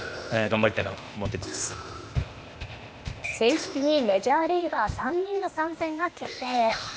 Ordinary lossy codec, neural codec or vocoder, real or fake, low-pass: none; codec, 16 kHz, 0.8 kbps, ZipCodec; fake; none